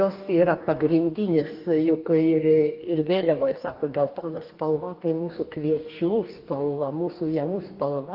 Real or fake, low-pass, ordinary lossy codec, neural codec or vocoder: fake; 5.4 kHz; Opus, 32 kbps; codec, 44.1 kHz, 2.6 kbps, DAC